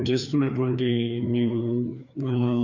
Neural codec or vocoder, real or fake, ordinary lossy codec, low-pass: codec, 16 kHz, 2 kbps, FreqCodec, larger model; fake; none; 7.2 kHz